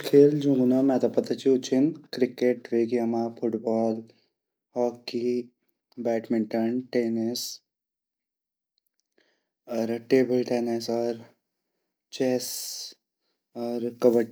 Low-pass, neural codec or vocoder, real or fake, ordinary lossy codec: none; none; real; none